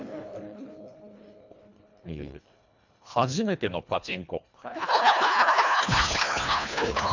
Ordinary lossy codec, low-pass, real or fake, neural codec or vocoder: none; 7.2 kHz; fake; codec, 24 kHz, 1.5 kbps, HILCodec